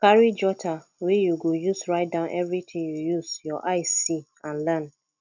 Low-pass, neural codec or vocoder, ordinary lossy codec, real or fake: 7.2 kHz; none; none; real